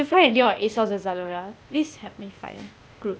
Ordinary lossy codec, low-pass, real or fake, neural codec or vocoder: none; none; fake; codec, 16 kHz, 0.8 kbps, ZipCodec